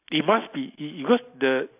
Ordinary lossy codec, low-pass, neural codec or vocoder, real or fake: AAC, 32 kbps; 3.6 kHz; none; real